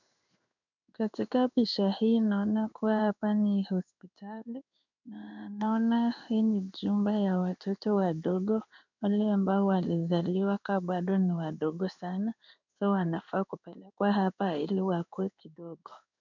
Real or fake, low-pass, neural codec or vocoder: fake; 7.2 kHz; codec, 16 kHz in and 24 kHz out, 1 kbps, XY-Tokenizer